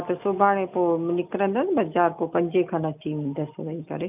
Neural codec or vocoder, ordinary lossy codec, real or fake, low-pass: none; none; real; 3.6 kHz